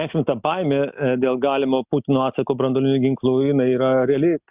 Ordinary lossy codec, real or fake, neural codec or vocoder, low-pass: Opus, 64 kbps; real; none; 3.6 kHz